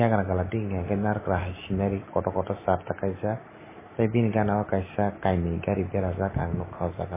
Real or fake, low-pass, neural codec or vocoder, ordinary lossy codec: real; 3.6 kHz; none; MP3, 16 kbps